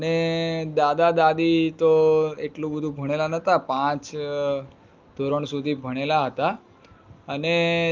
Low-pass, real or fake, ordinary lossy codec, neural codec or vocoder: 7.2 kHz; real; Opus, 32 kbps; none